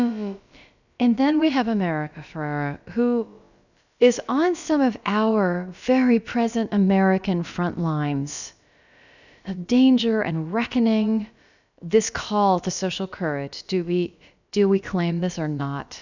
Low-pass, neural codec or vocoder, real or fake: 7.2 kHz; codec, 16 kHz, about 1 kbps, DyCAST, with the encoder's durations; fake